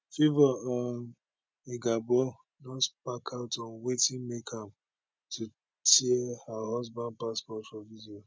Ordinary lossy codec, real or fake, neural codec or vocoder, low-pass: none; real; none; none